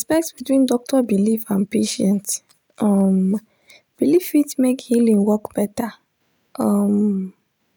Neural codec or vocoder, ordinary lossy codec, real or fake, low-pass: none; none; real; none